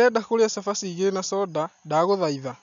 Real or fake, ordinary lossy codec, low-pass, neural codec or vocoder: real; none; 7.2 kHz; none